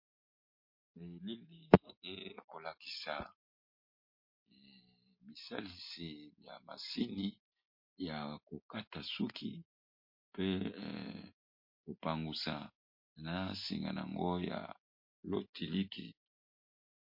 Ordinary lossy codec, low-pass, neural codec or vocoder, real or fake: MP3, 32 kbps; 5.4 kHz; none; real